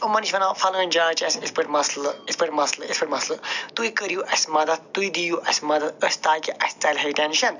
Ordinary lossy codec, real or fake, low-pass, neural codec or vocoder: none; real; 7.2 kHz; none